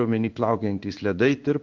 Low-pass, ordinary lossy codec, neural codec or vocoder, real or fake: 7.2 kHz; Opus, 32 kbps; codec, 16 kHz in and 24 kHz out, 1 kbps, XY-Tokenizer; fake